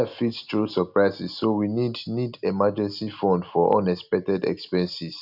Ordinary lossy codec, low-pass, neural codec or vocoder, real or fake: none; 5.4 kHz; none; real